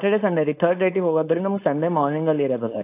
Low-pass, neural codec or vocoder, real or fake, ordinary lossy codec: 3.6 kHz; codec, 16 kHz, 4.8 kbps, FACodec; fake; AAC, 24 kbps